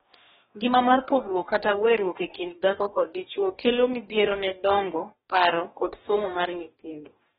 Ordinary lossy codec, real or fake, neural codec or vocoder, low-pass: AAC, 16 kbps; fake; codec, 44.1 kHz, 2.6 kbps, DAC; 19.8 kHz